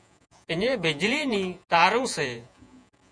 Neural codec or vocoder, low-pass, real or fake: vocoder, 48 kHz, 128 mel bands, Vocos; 9.9 kHz; fake